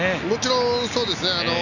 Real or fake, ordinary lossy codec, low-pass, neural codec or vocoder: real; none; 7.2 kHz; none